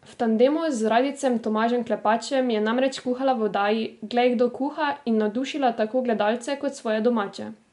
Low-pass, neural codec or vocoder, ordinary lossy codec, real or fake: 10.8 kHz; none; MP3, 64 kbps; real